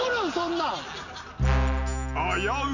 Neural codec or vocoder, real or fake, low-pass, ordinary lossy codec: none; real; 7.2 kHz; none